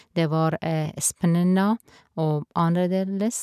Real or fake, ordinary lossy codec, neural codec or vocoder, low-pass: real; none; none; 14.4 kHz